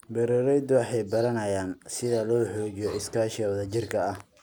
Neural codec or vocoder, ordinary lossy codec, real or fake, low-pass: none; none; real; none